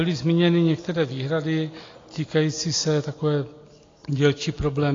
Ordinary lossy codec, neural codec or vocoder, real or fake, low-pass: AAC, 32 kbps; none; real; 7.2 kHz